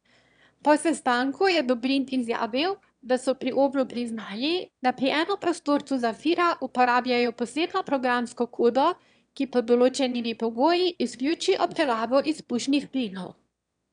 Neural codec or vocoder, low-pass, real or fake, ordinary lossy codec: autoencoder, 22.05 kHz, a latent of 192 numbers a frame, VITS, trained on one speaker; 9.9 kHz; fake; none